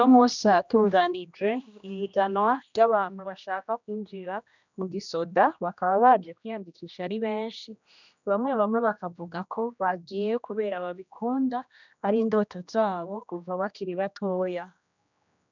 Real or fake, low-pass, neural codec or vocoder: fake; 7.2 kHz; codec, 16 kHz, 1 kbps, X-Codec, HuBERT features, trained on general audio